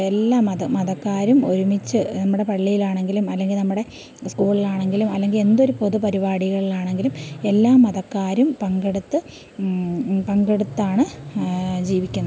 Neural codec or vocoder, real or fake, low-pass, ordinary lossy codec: none; real; none; none